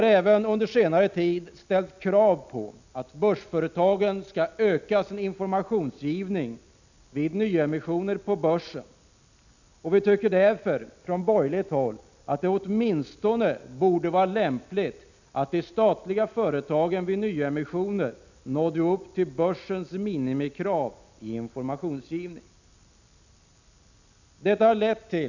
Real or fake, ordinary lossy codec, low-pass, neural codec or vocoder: real; none; 7.2 kHz; none